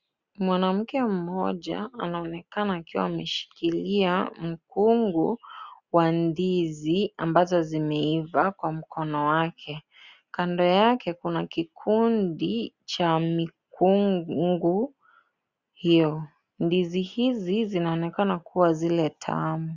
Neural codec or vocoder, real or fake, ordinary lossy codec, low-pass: none; real; Opus, 64 kbps; 7.2 kHz